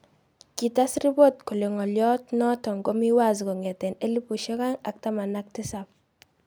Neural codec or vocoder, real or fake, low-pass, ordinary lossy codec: none; real; none; none